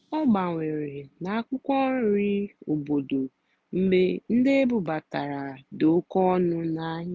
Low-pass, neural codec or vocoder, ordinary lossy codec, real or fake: none; none; none; real